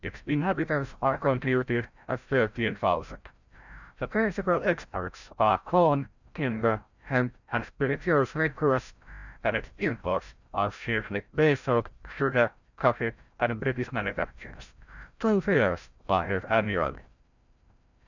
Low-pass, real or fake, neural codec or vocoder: 7.2 kHz; fake; codec, 16 kHz, 0.5 kbps, FreqCodec, larger model